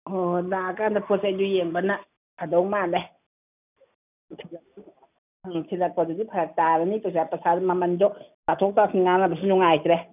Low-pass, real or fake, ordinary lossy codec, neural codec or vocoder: 3.6 kHz; real; none; none